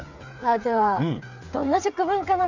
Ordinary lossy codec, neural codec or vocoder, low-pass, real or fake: none; codec, 16 kHz, 8 kbps, FreqCodec, smaller model; 7.2 kHz; fake